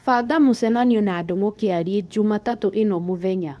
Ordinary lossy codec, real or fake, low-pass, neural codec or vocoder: none; fake; none; codec, 24 kHz, 0.9 kbps, WavTokenizer, medium speech release version 2